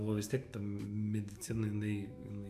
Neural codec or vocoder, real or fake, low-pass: autoencoder, 48 kHz, 128 numbers a frame, DAC-VAE, trained on Japanese speech; fake; 14.4 kHz